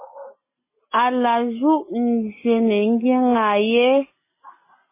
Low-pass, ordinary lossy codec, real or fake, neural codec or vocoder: 3.6 kHz; MP3, 16 kbps; real; none